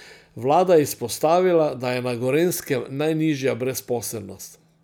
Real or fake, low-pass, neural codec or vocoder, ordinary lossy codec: real; none; none; none